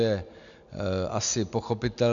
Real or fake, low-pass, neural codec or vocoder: real; 7.2 kHz; none